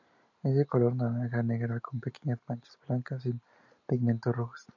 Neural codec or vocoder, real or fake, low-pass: none; real; 7.2 kHz